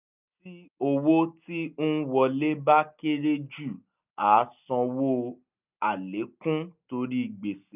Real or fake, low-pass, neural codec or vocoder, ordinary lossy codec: real; 3.6 kHz; none; none